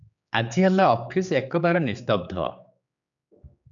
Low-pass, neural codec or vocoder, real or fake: 7.2 kHz; codec, 16 kHz, 4 kbps, X-Codec, HuBERT features, trained on general audio; fake